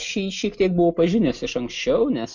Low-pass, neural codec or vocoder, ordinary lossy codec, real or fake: 7.2 kHz; none; MP3, 64 kbps; real